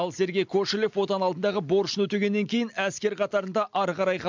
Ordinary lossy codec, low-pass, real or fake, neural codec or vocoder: MP3, 64 kbps; 7.2 kHz; real; none